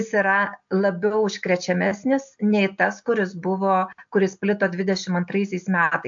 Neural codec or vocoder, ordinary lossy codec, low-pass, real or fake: none; AAC, 64 kbps; 7.2 kHz; real